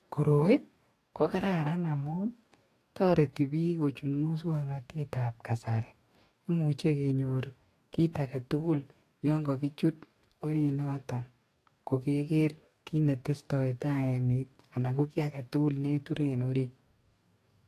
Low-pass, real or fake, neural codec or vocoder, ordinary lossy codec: 14.4 kHz; fake; codec, 44.1 kHz, 2.6 kbps, DAC; AAC, 64 kbps